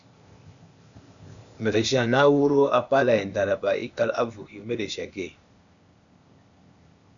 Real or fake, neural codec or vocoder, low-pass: fake; codec, 16 kHz, 0.8 kbps, ZipCodec; 7.2 kHz